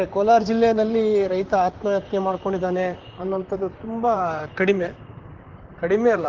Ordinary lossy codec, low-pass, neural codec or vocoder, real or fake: Opus, 16 kbps; 7.2 kHz; vocoder, 44.1 kHz, 128 mel bands, Pupu-Vocoder; fake